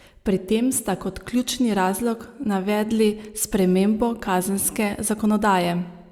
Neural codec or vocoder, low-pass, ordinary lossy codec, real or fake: none; 19.8 kHz; Opus, 64 kbps; real